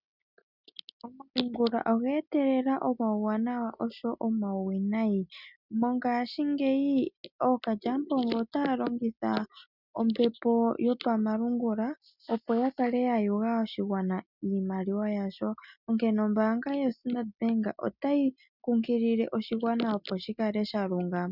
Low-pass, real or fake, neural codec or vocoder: 5.4 kHz; real; none